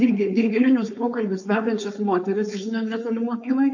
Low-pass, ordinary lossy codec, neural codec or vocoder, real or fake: 7.2 kHz; MP3, 48 kbps; codec, 16 kHz, 4.8 kbps, FACodec; fake